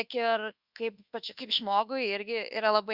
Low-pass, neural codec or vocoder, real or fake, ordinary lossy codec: 5.4 kHz; codec, 24 kHz, 1.2 kbps, DualCodec; fake; Opus, 64 kbps